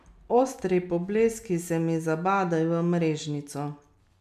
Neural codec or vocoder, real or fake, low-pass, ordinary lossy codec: none; real; 14.4 kHz; none